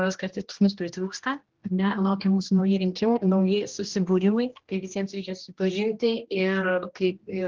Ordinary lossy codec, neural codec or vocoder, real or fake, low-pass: Opus, 16 kbps; codec, 16 kHz, 1 kbps, X-Codec, HuBERT features, trained on general audio; fake; 7.2 kHz